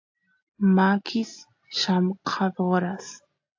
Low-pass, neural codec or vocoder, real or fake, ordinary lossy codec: 7.2 kHz; none; real; AAC, 48 kbps